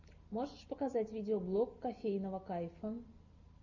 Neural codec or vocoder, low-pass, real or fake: none; 7.2 kHz; real